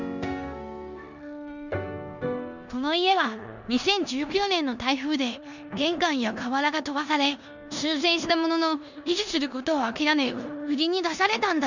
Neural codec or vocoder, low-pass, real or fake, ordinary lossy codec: codec, 16 kHz in and 24 kHz out, 0.9 kbps, LongCat-Audio-Codec, four codebook decoder; 7.2 kHz; fake; none